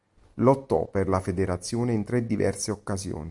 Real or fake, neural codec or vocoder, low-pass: real; none; 10.8 kHz